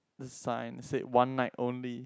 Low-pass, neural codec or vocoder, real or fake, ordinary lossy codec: none; none; real; none